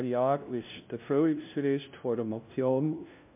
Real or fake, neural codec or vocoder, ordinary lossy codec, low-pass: fake; codec, 16 kHz, 0.5 kbps, FunCodec, trained on LibriTTS, 25 frames a second; MP3, 32 kbps; 3.6 kHz